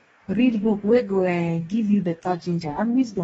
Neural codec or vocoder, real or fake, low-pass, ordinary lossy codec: codec, 44.1 kHz, 2.6 kbps, DAC; fake; 19.8 kHz; AAC, 24 kbps